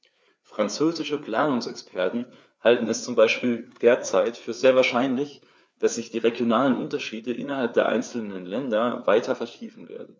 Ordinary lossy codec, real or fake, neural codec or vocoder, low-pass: none; fake; codec, 16 kHz, 4 kbps, FreqCodec, larger model; none